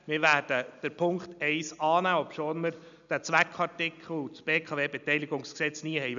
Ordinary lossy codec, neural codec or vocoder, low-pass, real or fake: MP3, 96 kbps; none; 7.2 kHz; real